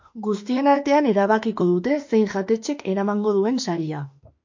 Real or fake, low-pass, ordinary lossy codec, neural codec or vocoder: fake; 7.2 kHz; MP3, 48 kbps; autoencoder, 48 kHz, 32 numbers a frame, DAC-VAE, trained on Japanese speech